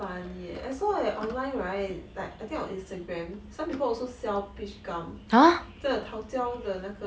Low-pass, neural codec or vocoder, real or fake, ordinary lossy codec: none; none; real; none